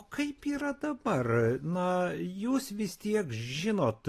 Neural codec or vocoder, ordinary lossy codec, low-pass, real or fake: none; AAC, 48 kbps; 14.4 kHz; real